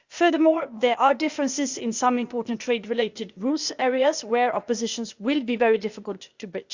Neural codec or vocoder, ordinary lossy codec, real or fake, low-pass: codec, 16 kHz, 0.8 kbps, ZipCodec; Opus, 64 kbps; fake; 7.2 kHz